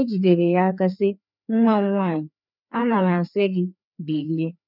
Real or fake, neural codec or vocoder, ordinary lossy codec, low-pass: fake; codec, 16 kHz, 2 kbps, FreqCodec, larger model; none; 5.4 kHz